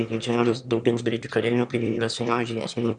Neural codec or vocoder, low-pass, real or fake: autoencoder, 22.05 kHz, a latent of 192 numbers a frame, VITS, trained on one speaker; 9.9 kHz; fake